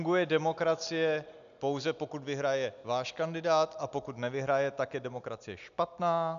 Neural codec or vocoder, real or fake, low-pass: none; real; 7.2 kHz